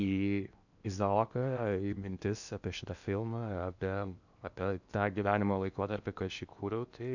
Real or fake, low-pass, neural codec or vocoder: fake; 7.2 kHz; codec, 16 kHz in and 24 kHz out, 0.8 kbps, FocalCodec, streaming, 65536 codes